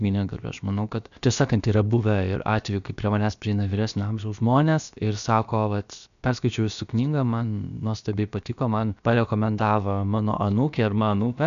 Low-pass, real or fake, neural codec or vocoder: 7.2 kHz; fake; codec, 16 kHz, about 1 kbps, DyCAST, with the encoder's durations